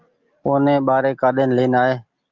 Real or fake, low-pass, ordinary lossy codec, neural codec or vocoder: real; 7.2 kHz; Opus, 24 kbps; none